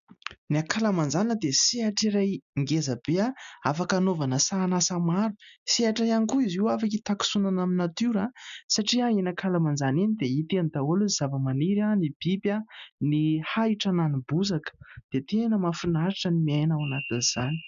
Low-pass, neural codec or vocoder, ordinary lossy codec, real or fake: 7.2 kHz; none; AAC, 96 kbps; real